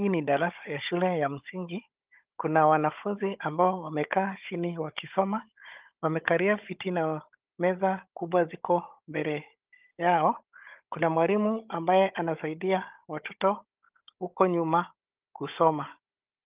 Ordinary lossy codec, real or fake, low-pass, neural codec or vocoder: Opus, 32 kbps; fake; 3.6 kHz; codec, 16 kHz, 16 kbps, FunCodec, trained on Chinese and English, 50 frames a second